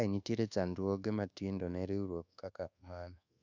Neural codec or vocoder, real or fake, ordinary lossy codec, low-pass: codec, 24 kHz, 1.2 kbps, DualCodec; fake; none; 7.2 kHz